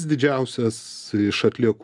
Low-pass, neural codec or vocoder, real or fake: 10.8 kHz; none; real